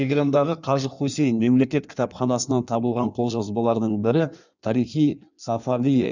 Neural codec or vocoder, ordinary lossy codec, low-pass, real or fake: codec, 16 kHz in and 24 kHz out, 1.1 kbps, FireRedTTS-2 codec; none; 7.2 kHz; fake